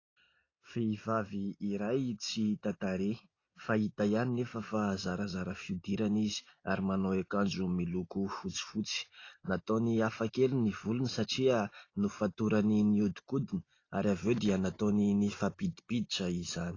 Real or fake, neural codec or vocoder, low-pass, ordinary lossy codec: real; none; 7.2 kHz; AAC, 32 kbps